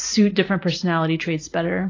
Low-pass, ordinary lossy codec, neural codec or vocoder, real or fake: 7.2 kHz; AAC, 32 kbps; none; real